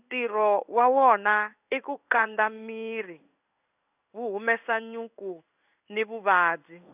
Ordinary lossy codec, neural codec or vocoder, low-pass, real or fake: none; codec, 16 kHz in and 24 kHz out, 1 kbps, XY-Tokenizer; 3.6 kHz; fake